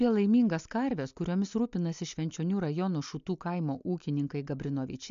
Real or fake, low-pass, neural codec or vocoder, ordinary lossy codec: real; 7.2 kHz; none; MP3, 64 kbps